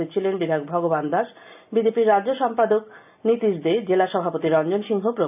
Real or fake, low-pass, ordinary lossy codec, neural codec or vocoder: real; 3.6 kHz; none; none